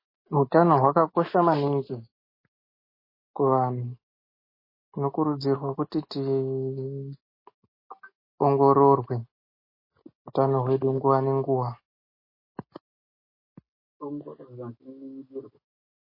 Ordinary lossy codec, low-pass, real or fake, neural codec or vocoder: MP3, 24 kbps; 5.4 kHz; real; none